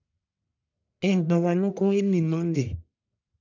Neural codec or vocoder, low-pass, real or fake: codec, 44.1 kHz, 1.7 kbps, Pupu-Codec; 7.2 kHz; fake